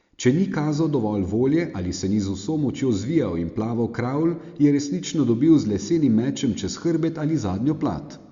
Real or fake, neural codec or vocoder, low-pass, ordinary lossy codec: real; none; 7.2 kHz; Opus, 64 kbps